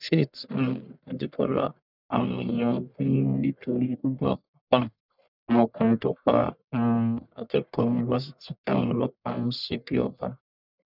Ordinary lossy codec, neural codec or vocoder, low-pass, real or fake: none; codec, 44.1 kHz, 1.7 kbps, Pupu-Codec; 5.4 kHz; fake